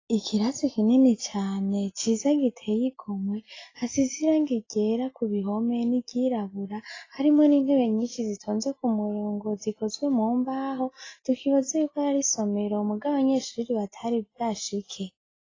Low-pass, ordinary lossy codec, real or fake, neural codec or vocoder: 7.2 kHz; AAC, 32 kbps; real; none